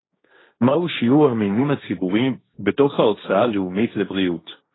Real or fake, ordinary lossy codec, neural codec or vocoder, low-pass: fake; AAC, 16 kbps; codec, 16 kHz, 1.1 kbps, Voila-Tokenizer; 7.2 kHz